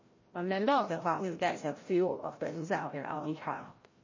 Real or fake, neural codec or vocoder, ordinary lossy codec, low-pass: fake; codec, 16 kHz, 0.5 kbps, FreqCodec, larger model; MP3, 32 kbps; 7.2 kHz